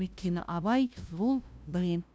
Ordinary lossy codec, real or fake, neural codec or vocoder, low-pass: none; fake; codec, 16 kHz, 0.5 kbps, FunCodec, trained on LibriTTS, 25 frames a second; none